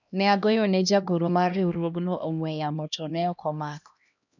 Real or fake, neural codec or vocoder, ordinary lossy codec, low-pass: fake; codec, 16 kHz, 1 kbps, X-Codec, HuBERT features, trained on LibriSpeech; none; 7.2 kHz